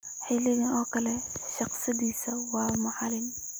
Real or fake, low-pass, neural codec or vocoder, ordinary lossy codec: real; none; none; none